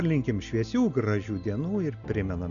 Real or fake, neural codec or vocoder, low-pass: real; none; 7.2 kHz